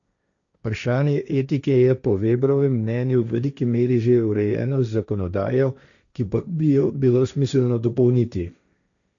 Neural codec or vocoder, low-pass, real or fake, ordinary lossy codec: codec, 16 kHz, 1.1 kbps, Voila-Tokenizer; 7.2 kHz; fake; none